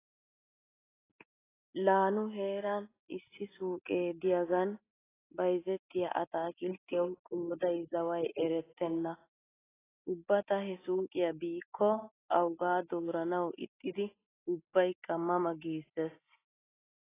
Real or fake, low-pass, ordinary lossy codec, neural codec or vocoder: real; 3.6 kHz; AAC, 16 kbps; none